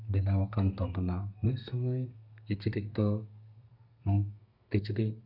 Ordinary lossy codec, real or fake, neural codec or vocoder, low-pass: none; fake; codec, 32 kHz, 1.9 kbps, SNAC; 5.4 kHz